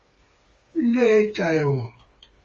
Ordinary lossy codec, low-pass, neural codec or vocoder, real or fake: Opus, 32 kbps; 7.2 kHz; codec, 16 kHz, 4 kbps, FreqCodec, smaller model; fake